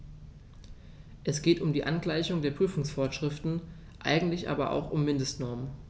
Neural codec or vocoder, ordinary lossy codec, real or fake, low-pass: none; none; real; none